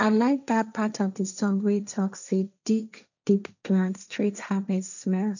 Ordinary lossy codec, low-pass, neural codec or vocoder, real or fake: none; 7.2 kHz; codec, 16 kHz, 1.1 kbps, Voila-Tokenizer; fake